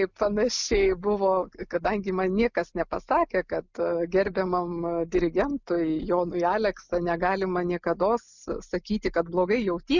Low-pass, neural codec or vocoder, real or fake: 7.2 kHz; none; real